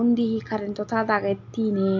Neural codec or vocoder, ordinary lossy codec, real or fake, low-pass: none; MP3, 48 kbps; real; 7.2 kHz